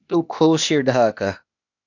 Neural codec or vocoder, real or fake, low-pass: codec, 16 kHz, 0.8 kbps, ZipCodec; fake; 7.2 kHz